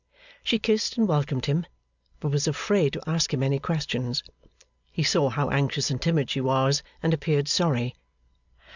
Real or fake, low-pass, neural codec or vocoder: real; 7.2 kHz; none